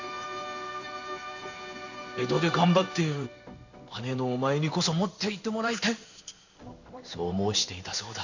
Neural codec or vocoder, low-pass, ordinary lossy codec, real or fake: codec, 16 kHz in and 24 kHz out, 1 kbps, XY-Tokenizer; 7.2 kHz; none; fake